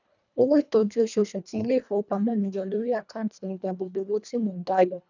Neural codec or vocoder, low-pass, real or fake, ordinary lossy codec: codec, 24 kHz, 1.5 kbps, HILCodec; 7.2 kHz; fake; none